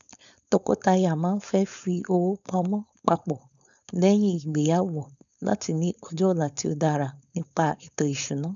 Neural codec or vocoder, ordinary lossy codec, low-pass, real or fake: codec, 16 kHz, 4.8 kbps, FACodec; none; 7.2 kHz; fake